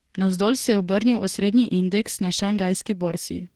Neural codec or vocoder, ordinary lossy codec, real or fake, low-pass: codec, 44.1 kHz, 2.6 kbps, DAC; Opus, 16 kbps; fake; 19.8 kHz